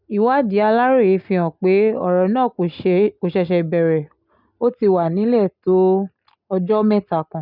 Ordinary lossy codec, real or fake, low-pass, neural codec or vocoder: none; real; 5.4 kHz; none